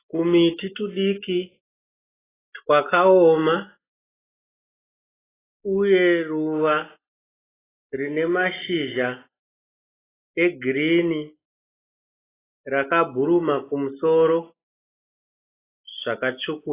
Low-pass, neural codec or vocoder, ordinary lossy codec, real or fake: 3.6 kHz; none; AAC, 16 kbps; real